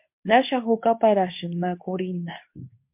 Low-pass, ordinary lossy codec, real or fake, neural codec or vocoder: 3.6 kHz; MP3, 32 kbps; fake; codec, 24 kHz, 0.9 kbps, WavTokenizer, medium speech release version 2